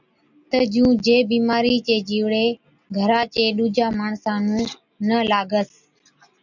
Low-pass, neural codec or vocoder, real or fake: 7.2 kHz; none; real